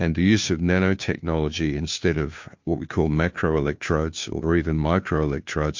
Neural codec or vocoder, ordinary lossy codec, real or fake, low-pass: codec, 16 kHz, 2 kbps, FunCodec, trained on Chinese and English, 25 frames a second; MP3, 48 kbps; fake; 7.2 kHz